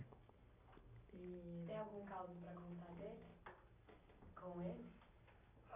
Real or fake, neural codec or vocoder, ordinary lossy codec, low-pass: real; none; AAC, 32 kbps; 3.6 kHz